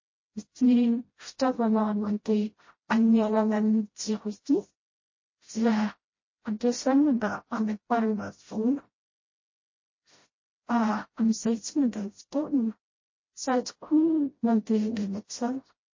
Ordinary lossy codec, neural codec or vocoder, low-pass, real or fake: MP3, 32 kbps; codec, 16 kHz, 0.5 kbps, FreqCodec, smaller model; 7.2 kHz; fake